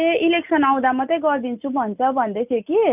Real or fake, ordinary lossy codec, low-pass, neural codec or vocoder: real; none; 3.6 kHz; none